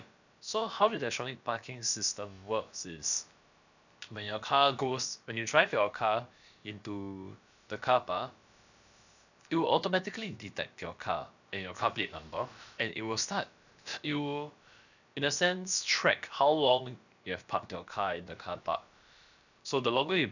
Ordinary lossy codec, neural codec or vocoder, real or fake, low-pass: none; codec, 16 kHz, about 1 kbps, DyCAST, with the encoder's durations; fake; 7.2 kHz